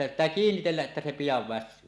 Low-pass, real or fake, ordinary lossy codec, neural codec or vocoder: none; real; none; none